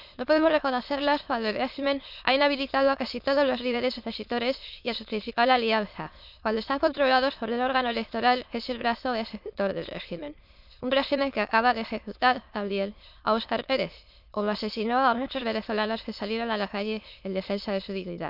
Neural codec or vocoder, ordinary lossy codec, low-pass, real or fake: autoencoder, 22.05 kHz, a latent of 192 numbers a frame, VITS, trained on many speakers; none; 5.4 kHz; fake